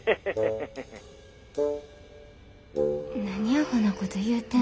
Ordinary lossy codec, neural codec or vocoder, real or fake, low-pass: none; none; real; none